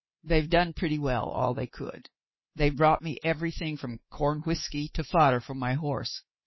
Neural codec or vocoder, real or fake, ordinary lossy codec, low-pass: codec, 24 kHz, 3.1 kbps, DualCodec; fake; MP3, 24 kbps; 7.2 kHz